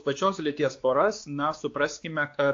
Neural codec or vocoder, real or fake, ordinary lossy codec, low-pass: codec, 16 kHz, 2 kbps, X-Codec, WavLM features, trained on Multilingual LibriSpeech; fake; AAC, 48 kbps; 7.2 kHz